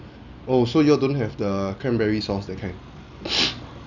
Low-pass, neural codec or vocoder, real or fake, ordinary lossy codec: 7.2 kHz; none; real; none